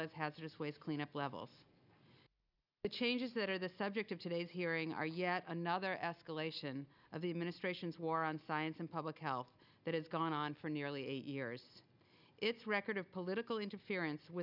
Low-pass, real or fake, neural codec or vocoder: 5.4 kHz; real; none